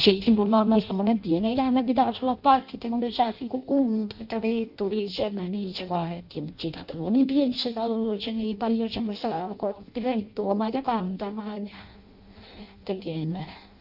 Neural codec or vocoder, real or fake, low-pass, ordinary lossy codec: codec, 16 kHz in and 24 kHz out, 0.6 kbps, FireRedTTS-2 codec; fake; 5.4 kHz; none